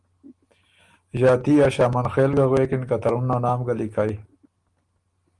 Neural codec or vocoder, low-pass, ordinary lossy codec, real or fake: none; 10.8 kHz; Opus, 24 kbps; real